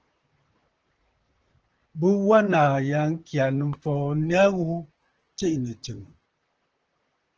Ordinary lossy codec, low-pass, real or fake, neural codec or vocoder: Opus, 16 kbps; 7.2 kHz; fake; vocoder, 44.1 kHz, 128 mel bands, Pupu-Vocoder